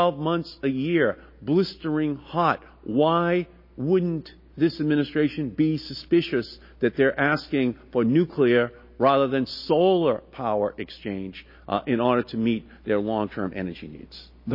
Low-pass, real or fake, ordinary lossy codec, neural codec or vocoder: 5.4 kHz; real; MP3, 24 kbps; none